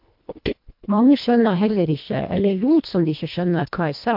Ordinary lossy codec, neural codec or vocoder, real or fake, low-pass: MP3, 48 kbps; codec, 24 kHz, 1.5 kbps, HILCodec; fake; 5.4 kHz